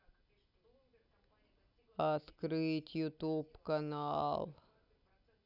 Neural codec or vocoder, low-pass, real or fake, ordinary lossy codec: none; 5.4 kHz; real; none